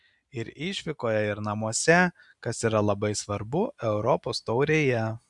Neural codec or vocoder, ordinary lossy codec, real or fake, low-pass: none; Opus, 64 kbps; real; 10.8 kHz